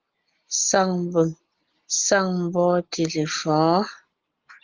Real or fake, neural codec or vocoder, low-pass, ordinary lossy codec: real; none; 7.2 kHz; Opus, 24 kbps